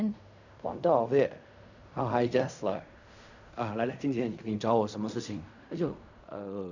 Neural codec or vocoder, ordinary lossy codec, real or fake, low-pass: codec, 16 kHz in and 24 kHz out, 0.4 kbps, LongCat-Audio-Codec, fine tuned four codebook decoder; none; fake; 7.2 kHz